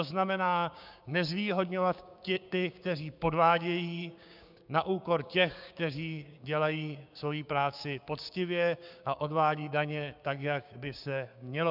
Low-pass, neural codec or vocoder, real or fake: 5.4 kHz; codec, 16 kHz, 6 kbps, DAC; fake